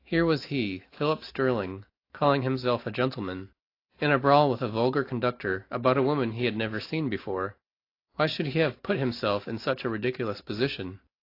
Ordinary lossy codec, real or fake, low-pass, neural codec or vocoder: AAC, 32 kbps; real; 5.4 kHz; none